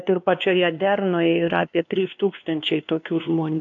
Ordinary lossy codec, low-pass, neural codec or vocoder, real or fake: AAC, 64 kbps; 7.2 kHz; codec, 16 kHz, 2 kbps, X-Codec, WavLM features, trained on Multilingual LibriSpeech; fake